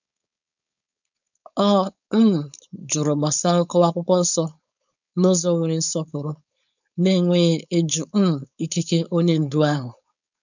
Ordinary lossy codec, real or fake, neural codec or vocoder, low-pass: none; fake; codec, 16 kHz, 4.8 kbps, FACodec; 7.2 kHz